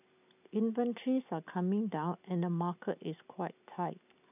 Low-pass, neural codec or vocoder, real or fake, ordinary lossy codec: 3.6 kHz; none; real; none